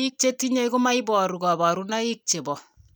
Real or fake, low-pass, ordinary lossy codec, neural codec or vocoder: real; none; none; none